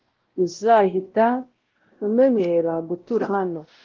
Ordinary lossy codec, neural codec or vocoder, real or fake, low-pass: Opus, 16 kbps; codec, 16 kHz, 0.5 kbps, X-Codec, WavLM features, trained on Multilingual LibriSpeech; fake; 7.2 kHz